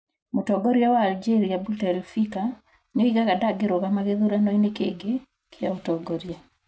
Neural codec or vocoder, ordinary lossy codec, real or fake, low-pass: none; none; real; none